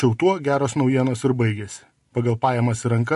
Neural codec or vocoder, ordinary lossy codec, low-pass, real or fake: none; MP3, 48 kbps; 14.4 kHz; real